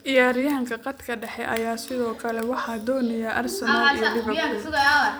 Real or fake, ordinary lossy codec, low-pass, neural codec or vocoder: fake; none; none; vocoder, 44.1 kHz, 128 mel bands every 256 samples, BigVGAN v2